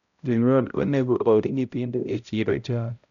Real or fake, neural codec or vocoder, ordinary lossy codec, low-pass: fake; codec, 16 kHz, 0.5 kbps, X-Codec, HuBERT features, trained on balanced general audio; none; 7.2 kHz